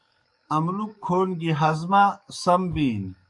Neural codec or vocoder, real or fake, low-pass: codec, 44.1 kHz, 7.8 kbps, DAC; fake; 10.8 kHz